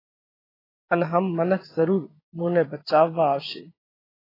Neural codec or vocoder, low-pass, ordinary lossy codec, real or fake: vocoder, 44.1 kHz, 128 mel bands every 512 samples, BigVGAN v2; 5.4 kHz; AAC, 24 kbps; fake